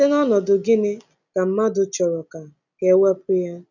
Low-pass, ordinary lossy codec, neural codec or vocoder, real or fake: 7.2 kHz; none; none; real